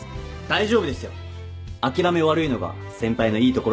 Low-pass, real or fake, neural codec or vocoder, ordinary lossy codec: none; real; none; none